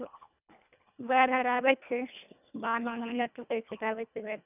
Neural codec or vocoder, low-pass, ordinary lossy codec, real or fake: codec, 24 kHz, 1.5 kbps, HILCodec; 3.6 kHz; none; fake